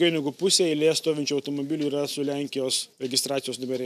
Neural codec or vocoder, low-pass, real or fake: none; 14.4 kHz; real